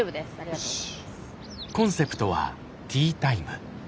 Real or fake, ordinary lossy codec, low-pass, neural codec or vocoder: real; none; none; none